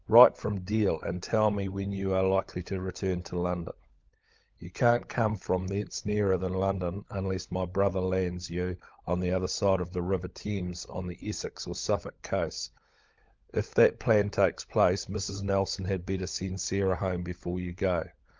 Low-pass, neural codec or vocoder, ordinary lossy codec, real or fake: 7.2 kHz; codec, 16 kHz, 16 kbps, FunCodec, trained on LibriTTS, 50 frames a second; Opus, 24 kbps; fake